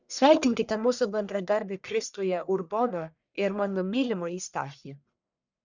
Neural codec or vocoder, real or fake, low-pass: codec, 44.1 kHz, 1.7 kbps, Pupu-Codec; fake; 7.2 kHz